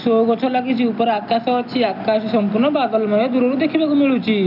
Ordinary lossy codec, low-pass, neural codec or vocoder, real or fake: none; 5.4 kHz; none; real